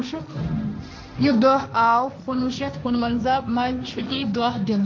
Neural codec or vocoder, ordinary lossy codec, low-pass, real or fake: codec, 16 kHz, 1.1 kbps, Voila-Tokenizer; none; none; fake